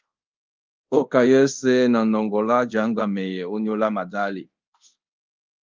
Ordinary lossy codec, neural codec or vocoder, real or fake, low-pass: Opus, 24 kbps; codec, 24 kHz, 0.5 kbps, DualCodec; fake; 7.2 kHz